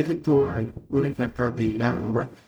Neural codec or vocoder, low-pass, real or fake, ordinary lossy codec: codec, 44.1 kHz, 0.9 kbps, DAC; none; fake; none